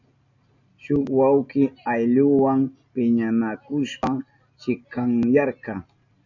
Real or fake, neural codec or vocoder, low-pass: real; none; 7.2 kHz